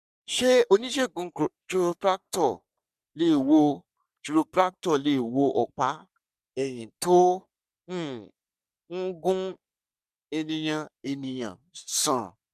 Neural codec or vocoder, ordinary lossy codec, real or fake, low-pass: codec, 44.1 kHz, 3.4 kbps, Pupu-Codec; none; fake; 14.4 kHz